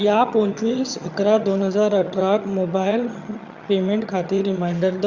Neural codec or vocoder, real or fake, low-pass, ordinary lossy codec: vocoder, 22.05 kHz, 80 mel bands, HiFi-GAN; fake; 7.2 kHz; Opus, 64 kbps